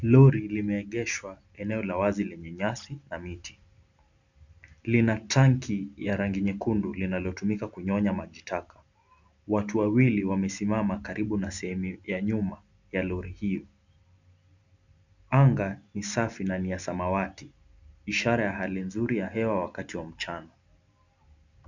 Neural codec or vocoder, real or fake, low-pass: none; real; 7.2 kHz